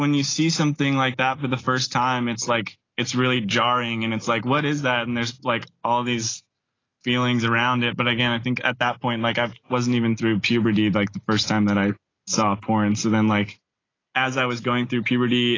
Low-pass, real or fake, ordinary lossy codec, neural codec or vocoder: 7.2 kHz; real; AAC, 32 kbps; none